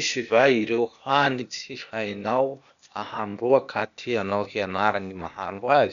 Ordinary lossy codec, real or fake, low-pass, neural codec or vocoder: none; fake; 7.2 kHz; codec, 16 kHz, 0.8 kbps, ZipCodec